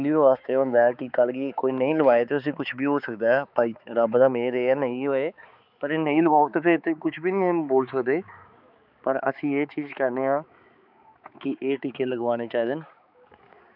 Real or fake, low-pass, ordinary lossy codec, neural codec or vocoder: fake; 5.4 kHz; none; codec, 16 kHz, 4 kbps, X-Codec, HuBERT features, trained on balanced general audio